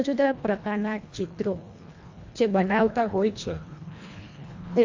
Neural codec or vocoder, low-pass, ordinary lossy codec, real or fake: codec, 24 kHz, 1.5 kbps, HILCodec; 7.2 kHz; AAC, 48 kbps; fake